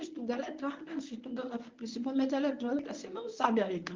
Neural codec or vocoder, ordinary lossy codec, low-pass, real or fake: codec, 24 kHz, 0.9 kbps, WavTokenizer, medium speech release version 1; Opus, 24 kbps; 7.2 kHz; fake